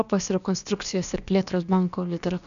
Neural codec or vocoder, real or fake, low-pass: codec, 16 kHz, about 1 kbps, DyCAST, with the encoder's durations; fake; 7.2 kHz